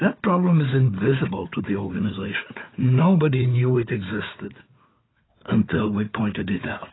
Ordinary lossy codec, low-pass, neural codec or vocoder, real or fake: AAC, 16 kbps; 7.2 kHz; codec, 16 kHz, 4 kbps, FunCodec, trained on Chinese and English, 50 frames a second; fake